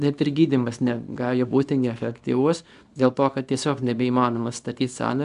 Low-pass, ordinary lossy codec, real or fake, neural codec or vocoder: 10.8 kHz; AAC, 96 kbps; fake; codec, 24 kHz, 0.9 kbps, WavTokenizer, small release